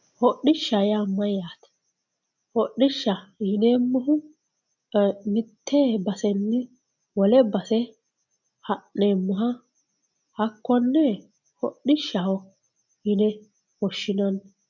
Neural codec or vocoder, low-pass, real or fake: none; 7.2 kHz; real